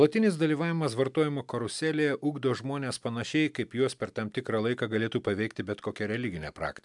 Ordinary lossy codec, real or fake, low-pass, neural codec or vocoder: MP3, 96 kbps; real; 10.8 kHz; none